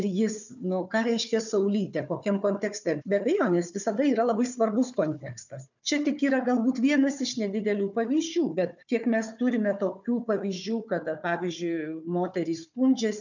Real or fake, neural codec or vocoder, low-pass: fake; codec, 16 kHz, 4 kbps, FunCodec, trained on Chinese and English, 50 frames a second; 7.2 kHz